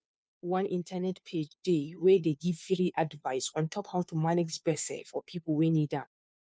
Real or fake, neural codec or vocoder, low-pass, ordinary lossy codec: fake; codec, 16 kHz, 2 kbps, FunCodec, trained on Chinese and English, 25 frames a second; none; none